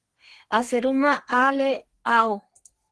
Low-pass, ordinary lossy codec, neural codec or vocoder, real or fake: 10.8 kHz; Opus, 16 kbps; codec, 32 kHz, 1.9 kbps, SNAC; fake